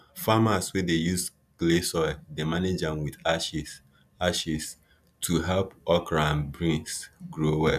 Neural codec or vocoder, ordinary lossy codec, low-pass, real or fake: none; none; 14.4 kHz; real